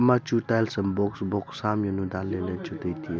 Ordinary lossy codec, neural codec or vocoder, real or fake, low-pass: none; none; real; none